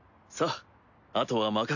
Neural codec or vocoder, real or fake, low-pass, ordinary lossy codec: none; real; 7.2 kHz; none